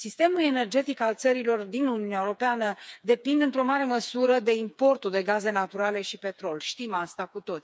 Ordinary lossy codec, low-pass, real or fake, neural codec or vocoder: none; none; fake; codec, 16 kHz, 4 kbps, FreqCodec, smaller model